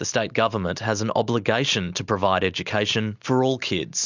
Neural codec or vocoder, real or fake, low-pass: none; real; 7.2 kHz